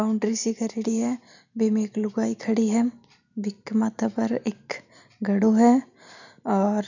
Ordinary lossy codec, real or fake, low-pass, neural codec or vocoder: none; real; 7.2 kHz; none